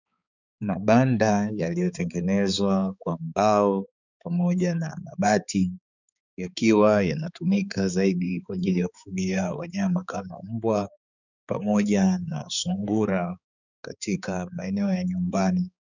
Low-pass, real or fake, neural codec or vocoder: 7.2 kHz; fake; codec, 16 kHz, 4 kbps, X-Codec, HuBERT features, trained on balanced general audio